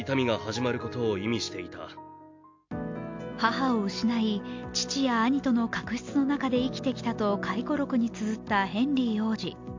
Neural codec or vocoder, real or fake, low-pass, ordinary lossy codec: none; real; 7.2 kHz; MP3, 48 kbps